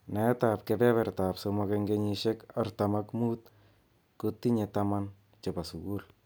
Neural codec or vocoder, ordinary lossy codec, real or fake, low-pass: none; none; real; none